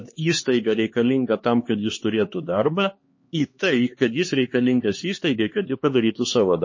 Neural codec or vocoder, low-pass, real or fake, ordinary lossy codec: codec, 16 kHz, 2 kbps, X-Codec, HuBERT features, trained on LibriSpeech; 7.2 kHz; fake; MP3, 32 kbps